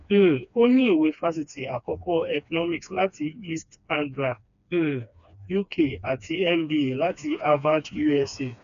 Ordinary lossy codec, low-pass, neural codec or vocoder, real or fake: none; 7.2 kHz; codec, 16 kHz, 2 kbps, FreqCodec, smaller model; fake